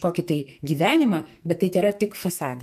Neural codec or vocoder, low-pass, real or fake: codec, 44.1 kHz, 2.6 kbps, SNAC; 14.4 kHz; fake